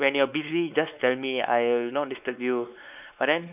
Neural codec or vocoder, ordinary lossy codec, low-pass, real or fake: codec, 16 kHz, 4 kbps, X-Codec, WavLM features, trained on Multilingual LibriSpeech; none; 3.6 kHz; fake